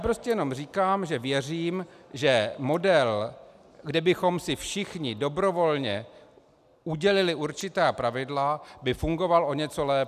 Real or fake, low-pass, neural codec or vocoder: real; 14.4 kHz; none